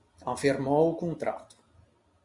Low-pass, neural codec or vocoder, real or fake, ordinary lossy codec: 10.8 kHz; none; real; Opus, 64 kbps